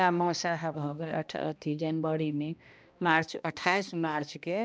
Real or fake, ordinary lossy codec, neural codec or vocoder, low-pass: fake; none; codec, 16 kHz, 1 kbps, X-Codec, HuBERT features, trained on balanced general audio; none